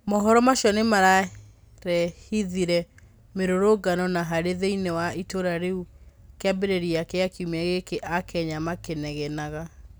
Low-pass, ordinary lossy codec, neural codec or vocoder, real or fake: none; none; none; real